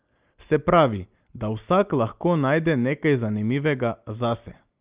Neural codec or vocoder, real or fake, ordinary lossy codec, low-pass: none; real; Opus, 24 kbps; 3.6 kHz